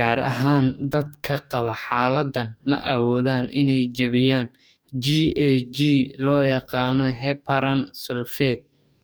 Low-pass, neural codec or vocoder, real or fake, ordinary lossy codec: none; codec, 44.1 kHz, 2.6 kbps, DAC; fake; none